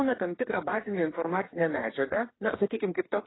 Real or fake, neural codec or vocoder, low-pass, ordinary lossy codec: fake; codec, 44.1 kHz, 2.6 kbps, DAC; 7.2 kHz; AAC, 16 kbps